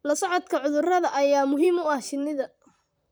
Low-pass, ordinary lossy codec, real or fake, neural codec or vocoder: none; none; fake; vocoder, 44.1 kHz, 128 mel bands, Pupu-Vocoder